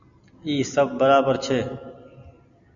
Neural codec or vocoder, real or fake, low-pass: none; real; 7.2 kHz